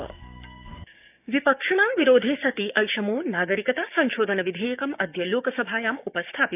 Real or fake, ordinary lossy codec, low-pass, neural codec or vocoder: fake; none; 3.6 kHz; codec, 16 kHz, 6 kbps, DAC